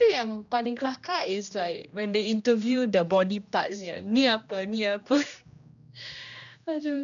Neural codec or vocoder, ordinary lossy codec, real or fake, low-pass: codec, 16 kHz, 1 kbps, X-Codec, HuBERT features, trained on general audio; none; fake; 7.2 kHz